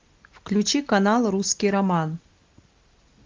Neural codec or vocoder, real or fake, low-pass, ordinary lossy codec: none; real; 7.2 kHz; Opus, 24 kbps